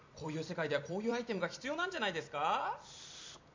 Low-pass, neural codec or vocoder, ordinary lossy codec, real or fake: 7.2 kHz; vocoder, 44.1 kHz, 128 mel bands every 256 samples, BigVGAN v2; MP3, 64 kbps; fake